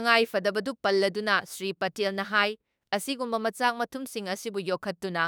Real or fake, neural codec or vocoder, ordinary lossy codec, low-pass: fake; autoencoder, 48 kHz, 32 numbers a frame, DAC-VAE, trained on Japanese speech; none; none